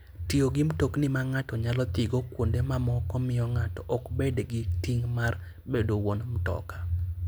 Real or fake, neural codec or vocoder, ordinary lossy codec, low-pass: real; none; none; none